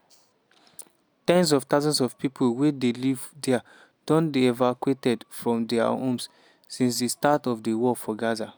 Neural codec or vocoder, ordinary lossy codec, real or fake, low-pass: none; none; real; none